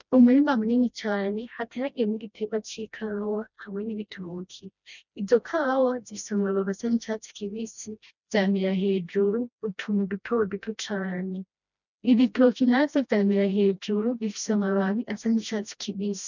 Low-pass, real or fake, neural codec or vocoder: 7.2 kHz; fake; codec, 16 kHz, 1 kbps, FreqCodec, smaller model